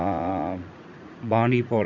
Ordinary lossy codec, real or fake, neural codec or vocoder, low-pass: none; fake; vocoder, 44.1 kHz, 80 mel bands, Vocos; 7.2 kHz